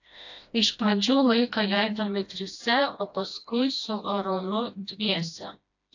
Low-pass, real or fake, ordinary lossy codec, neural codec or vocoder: 7.2 kHz; fake; AAC, 48 kbps; codec, 16 kHz, 1 kbps, FreqCodec, smaller model